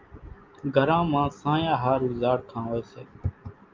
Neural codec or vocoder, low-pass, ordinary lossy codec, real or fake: none; 7.2 kHz; Opus, 24 kbps; real